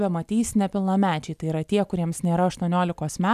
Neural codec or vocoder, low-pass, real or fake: none; 14.4 kHz; real